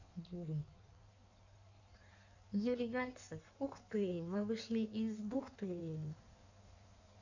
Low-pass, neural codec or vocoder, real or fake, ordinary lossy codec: 7.2 kHz; codec, 16 kHz in and 24 kHz out, 1.1 kbps, FireRedTTS-2 codec; fake; none